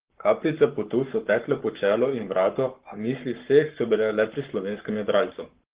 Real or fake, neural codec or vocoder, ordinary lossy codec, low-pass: fake; codec, 44.1 kHz, 7.8 kbps, Pupu-Codec; Opus, 16 kbps; 3.6 kHz